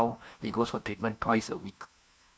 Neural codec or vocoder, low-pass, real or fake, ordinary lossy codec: codec, 16 kHz, 1 kbps, FunCodec, trained on LibriTTS, 50 frames a second; none; fake; none